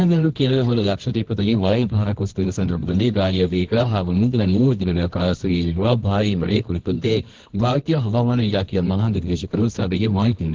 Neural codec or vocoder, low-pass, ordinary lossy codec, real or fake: codec, 24 kHz, 0.9 kbps, WavTokenizer, medium music audio release; 7.2 kHz; Opus, 16 kbps; fake